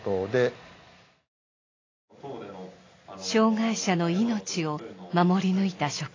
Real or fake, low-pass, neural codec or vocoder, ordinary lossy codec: real; 7.2 kHz; none; AAC, 32 kbps